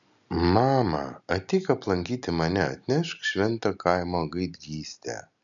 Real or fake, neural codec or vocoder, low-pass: real; none; 7.2 kHz